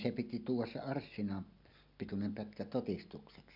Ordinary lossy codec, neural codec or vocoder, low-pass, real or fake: none; none; 5.4 kHz; real